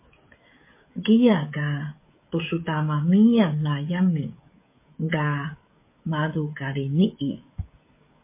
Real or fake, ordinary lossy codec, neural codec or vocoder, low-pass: fake; MP3, 24 kbps; codec, 16 kHz, 16 kbps, FreqCodec, smaller model; 3.6 kHz